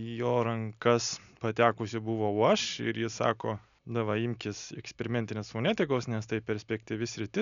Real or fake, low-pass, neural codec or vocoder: real; 7.2 kHz; none